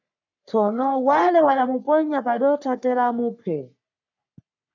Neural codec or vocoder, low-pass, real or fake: codec, 44.1 kHz, 3.4 kbps, Pupu-Codec; 7.2 kHz; fake